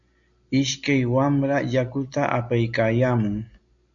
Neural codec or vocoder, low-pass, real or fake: none; 7.2 kHz; real